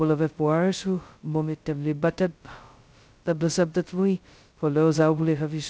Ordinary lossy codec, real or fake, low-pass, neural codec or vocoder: none; fake; none; codec, 16 kHz, 0.2 kbps, FocalCodec